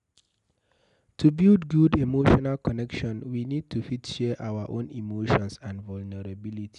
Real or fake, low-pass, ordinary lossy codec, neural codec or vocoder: real; 10.8 kHz; none; none